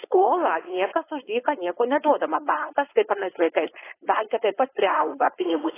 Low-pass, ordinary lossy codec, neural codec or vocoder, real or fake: 3.6 kHz; AAC, 16 kbps; codec, 16 kHz, 4.8 kbps, FACodec; fake